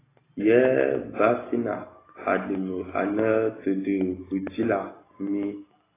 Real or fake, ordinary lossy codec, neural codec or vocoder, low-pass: real; AAC, 16 kbps; none; 3.6 kHz